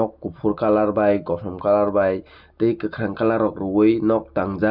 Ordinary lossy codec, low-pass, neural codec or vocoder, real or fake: none; 5.4 kHz; none; real